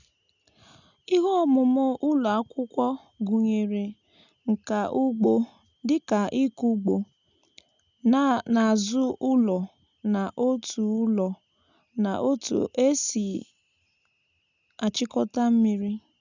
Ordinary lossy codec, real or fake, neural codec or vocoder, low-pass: none; real; none; 7.2 kHz